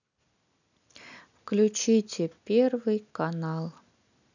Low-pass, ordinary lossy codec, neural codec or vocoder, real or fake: 7.2 kHz; none; none; real